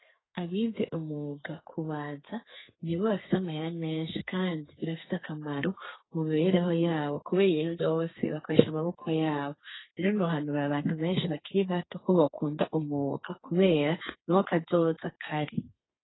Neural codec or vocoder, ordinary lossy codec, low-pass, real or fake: codec, 32 kHz, 1.9 kbps, SNAC; AAC, 16 kbps; 7.2 kHz; fake